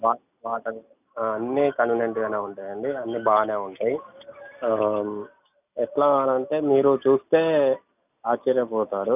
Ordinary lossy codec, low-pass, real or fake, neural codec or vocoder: none; 3.6 kHz; real; none